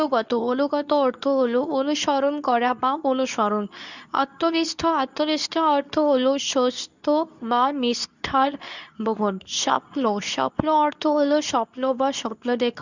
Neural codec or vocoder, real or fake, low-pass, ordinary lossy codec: codec, 24 kHz, 0.9 kbps, WavTokenizer, medium speech release version 2; fake; 7.2 kHz; none